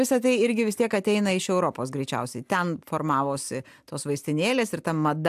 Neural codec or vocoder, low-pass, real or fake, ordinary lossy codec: none; 14.4 kHz; real; AAC, 96 kbps